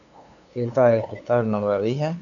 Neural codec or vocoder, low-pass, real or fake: codec, 16 kHz, 2 kbps, FunCodec, trained on LibriTTS, 25 frames a second; 7.2 kHz; fake